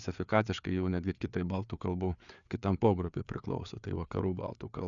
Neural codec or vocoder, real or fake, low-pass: codec, 16 kHz, 4 kbps, FreqCodec, larger model; fake; 7.2 kHz